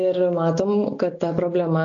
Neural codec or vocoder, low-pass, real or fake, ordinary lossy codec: none; 7.2 kHz; real; AAC, 48 kbps